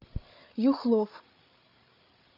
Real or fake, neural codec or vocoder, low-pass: fake; codec, 16 kHz, 8 kbps, FreqCodec, larger model; 5.4 kHz